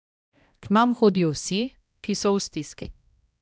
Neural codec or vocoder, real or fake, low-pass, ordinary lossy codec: codec, 16 kHz, 1 kbps, X-Codec, HuBERT features, trained on balanced general audio; fake; none; none